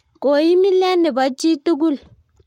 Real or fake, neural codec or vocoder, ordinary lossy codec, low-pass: fake; codec, 44.1 kHz, 7.8 kbps, Pupu-Codec; MP3, 64 kbps; 19.8 kHz